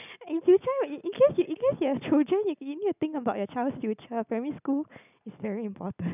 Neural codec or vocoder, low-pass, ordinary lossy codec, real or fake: none; 3.6 kHz; none; real